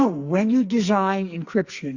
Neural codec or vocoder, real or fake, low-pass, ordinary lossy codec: codec, 44.1 kHz, 2.6 kbps, SNAC; fake; 7.2 kHz; Opus, 64 kbps